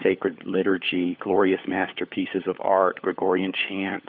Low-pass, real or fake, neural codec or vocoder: 5.4 kHz; fake; codec, 16 kHz, 4 kbps, FunCodec, trained on LibriTTS, 50 frames a second